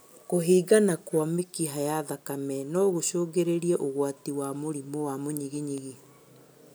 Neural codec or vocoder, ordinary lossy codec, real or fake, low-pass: none; none; real; none